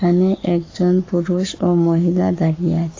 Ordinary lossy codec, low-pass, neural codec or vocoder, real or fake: AAC, 32 kbps; 7.2 kHz; codec, 44.1 kHz, 7.8 kbps, Pupu-Codec; fake